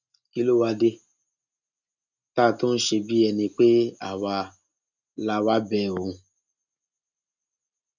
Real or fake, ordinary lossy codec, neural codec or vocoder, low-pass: real; none; none; 7.2 kHz